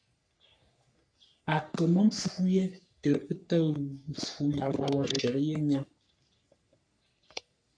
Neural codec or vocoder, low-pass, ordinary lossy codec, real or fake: codec, 44.1 kHz, 3.4 kbps, Pupu-Codec; 9.9 kHz; MP3, 64 kbps; fake